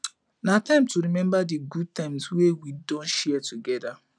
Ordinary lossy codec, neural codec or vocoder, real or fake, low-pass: none; none; real; 9.9 kHz